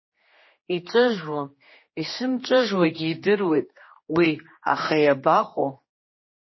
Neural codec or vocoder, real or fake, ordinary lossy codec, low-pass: codec, 16 kHz, 2 kbps, X-Codec, HuBERT features, trained on general audio; fake; MP3, 24 kbps; 7.2 kHz